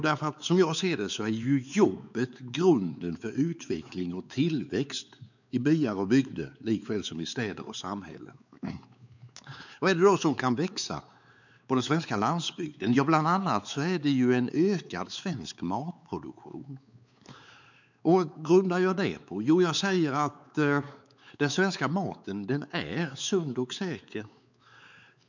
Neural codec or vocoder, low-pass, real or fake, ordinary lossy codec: codec, 16 kHz, 4 kbps, X-Codec, WavLM features, trained on Multilingual LibriSpeech; 7.2 kHz; fake; none